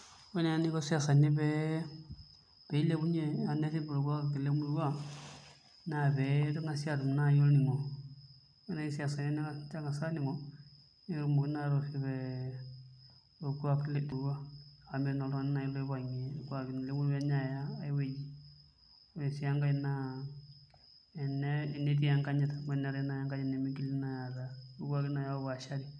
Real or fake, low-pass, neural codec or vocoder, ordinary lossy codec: real; 9.9 kHz; none; none